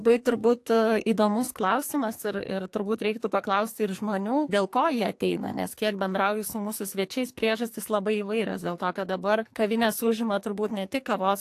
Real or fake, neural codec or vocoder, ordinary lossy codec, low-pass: fake; codec, 44.1 kHz, 2.6 kbps, SNAC; AAC, 64 kbps; 14.4 kHz